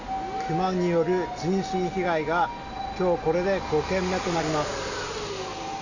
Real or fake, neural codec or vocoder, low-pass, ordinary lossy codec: real; none; 7.2 kHz; none